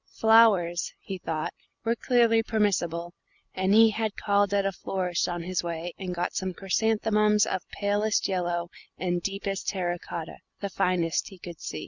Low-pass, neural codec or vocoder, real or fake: 7.2 kHz; none; real